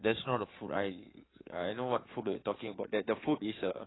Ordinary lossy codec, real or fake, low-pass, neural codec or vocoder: AAC, 16 kbps; fake; 7.2 kHz; codec, 16 kHz in and 24 kHz out, 2.2 kbps, FireRedTTS-2 codec